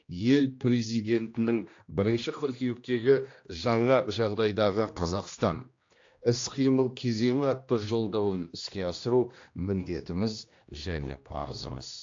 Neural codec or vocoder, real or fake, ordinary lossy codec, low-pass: codec, 16 kHz, 1 kbps, X-Codec, HuBERT features, trained on general audio; fake; AAC, 48 kbps; 7.2 kHz